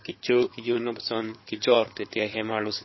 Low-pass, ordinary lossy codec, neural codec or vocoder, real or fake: 7.2 kHz; MP3, 24 kbps; codec, 16 kHz in and 24 kHz out, 2.2 kbps, FireRedTTS-2 codec; fake